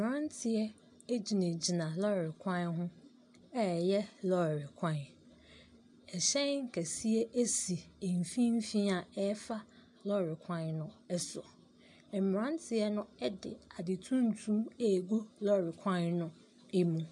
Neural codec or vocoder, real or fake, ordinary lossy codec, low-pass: none; real; MP3, 96 kbps; 10.8 kHz